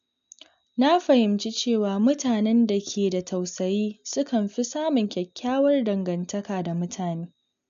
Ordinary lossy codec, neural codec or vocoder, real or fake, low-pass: MP3, 64 kbps; none; real; 7.2 kHz